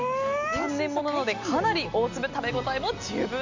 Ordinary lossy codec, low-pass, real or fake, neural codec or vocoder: MP3, 64 kbps; 7.2 kHz; real; none